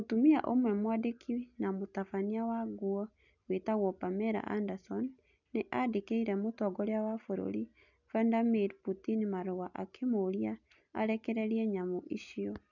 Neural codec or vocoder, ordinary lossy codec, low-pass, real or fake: none; none; 7.2 kHz; real